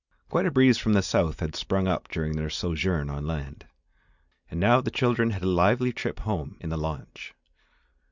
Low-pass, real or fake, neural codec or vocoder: 7.2 kHz; real; none